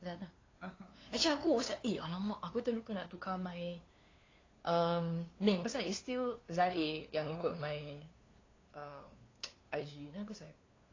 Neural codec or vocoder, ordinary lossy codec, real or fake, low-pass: codec, 16 kHz, 2 kbps, FunCodec, trained on LibriTTS, 25 frames a second; AAC, 32 kbps; fake; 7.2 kHz